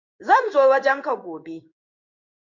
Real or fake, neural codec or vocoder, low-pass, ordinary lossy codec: fake; codec, 16 kHz in and 24 kHz out, 1 kbps, XY-Tokenizer; 7.2 kHz; MP3, 48 kbps